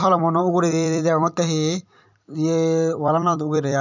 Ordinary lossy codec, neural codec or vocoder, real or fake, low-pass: none; vocoder, 44.1 kHz, 128 mel bands every 256 samples, BigVGAN v2; fake; 7.2 kHz